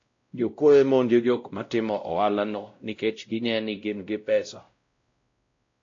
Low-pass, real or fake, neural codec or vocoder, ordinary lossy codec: 7.2 kHz; fake; codec, 16 kHz, 0.5 kbps, X-Codec, WavLM features, trained on Multilingual LibriSpeech; AAC, 48 kbps